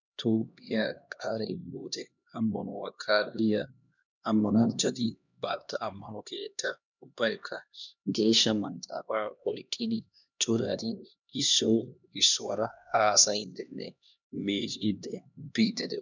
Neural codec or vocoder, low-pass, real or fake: codec, 16 kHz, 1 kbps, X-Codec, HuBERT features, trained on LibriSpeech; 7.2 kHz; fake